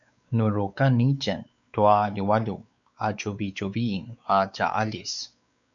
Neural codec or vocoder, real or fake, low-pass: codec, 16 kHz, 4 kbps, X-Codec, WavLM features, trained on Multilingual LibriSpeech; fake; 7.2 kHz